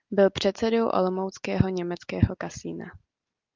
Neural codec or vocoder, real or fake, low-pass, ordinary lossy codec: none; real; 7.2 kHz; Opus, 24 kbps